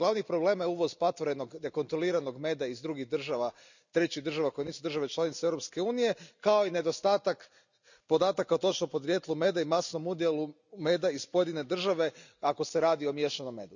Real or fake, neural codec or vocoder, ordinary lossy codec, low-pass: real; none; none; 7.2 kHz